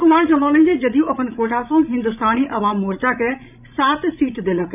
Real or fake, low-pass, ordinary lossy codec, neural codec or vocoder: fake; 3.6 kHz; none; codec, 16 kHz, 16 kbps, FreqCodec, larger model